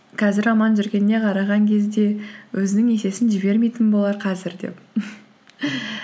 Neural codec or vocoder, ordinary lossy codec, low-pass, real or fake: none; none; none; real